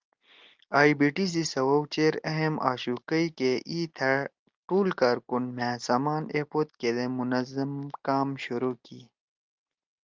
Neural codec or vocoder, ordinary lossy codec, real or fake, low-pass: none; Opus, 32 kbps; real; 7.2 kHz